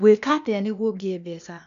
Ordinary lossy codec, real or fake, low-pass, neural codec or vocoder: none; fake; 7.2 kHz; codec, 16 kHz, 0.8 kbps, ZipCodec